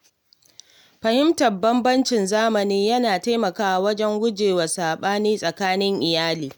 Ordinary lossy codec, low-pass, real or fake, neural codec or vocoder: none; none; real; none